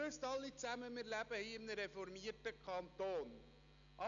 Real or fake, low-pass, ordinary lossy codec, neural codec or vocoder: real; 7.2 kHz; none; none